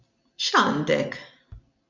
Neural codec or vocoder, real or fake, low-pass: none; real; 7.2 kHz